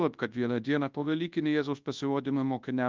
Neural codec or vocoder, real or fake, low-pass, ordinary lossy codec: codec, 24 kHz, 0.9 kbps, WavTokenizer, large speech release; fake; 7.2 kHz; Opus, 24 kbps